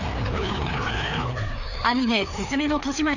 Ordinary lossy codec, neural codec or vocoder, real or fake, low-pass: none; codec, 16 kHz, 2 kbps, FreqCodec, larger model; fake; 7.2 kHz